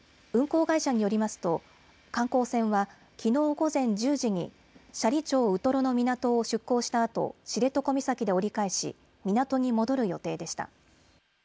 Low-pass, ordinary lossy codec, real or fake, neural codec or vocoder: none; none; real; none